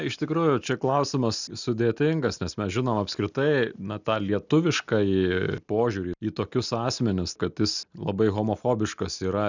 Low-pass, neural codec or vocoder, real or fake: 7.2 kHz; none; real